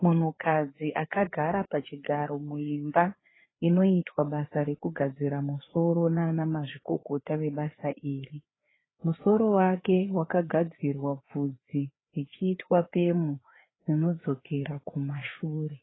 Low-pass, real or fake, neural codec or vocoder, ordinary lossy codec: 7.2 kHz; fake; codec, 16 kHz, 4 kbps, FreqCodec, larger model; AAC, 16 kbps